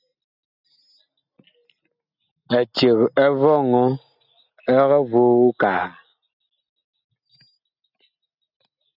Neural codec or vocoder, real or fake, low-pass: none; real; 5.4 kHz